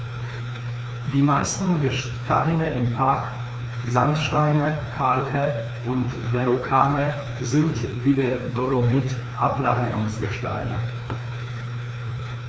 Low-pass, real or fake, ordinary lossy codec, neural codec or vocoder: none; fake; none; codec, 16 kHz, 2 kbps, FreqCodec, larger model